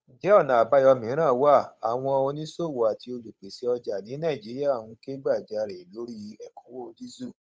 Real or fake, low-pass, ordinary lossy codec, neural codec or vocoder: fake; none; none; codec, 16 kHz, 8 kbps, FunCodec, trained on Chinese and English, 25 frames a second